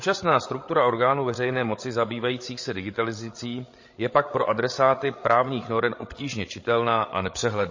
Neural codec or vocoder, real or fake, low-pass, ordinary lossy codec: codec, 16 kHz, 16 kbps, FreqCodec, larger model; fake; 7.2 kHz; MP3, 32 kbps